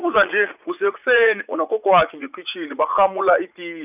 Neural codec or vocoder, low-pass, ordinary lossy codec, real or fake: vocoder, 44.1 kHz, 80 mel bands, Vocos; 3.6 kHz; none; fake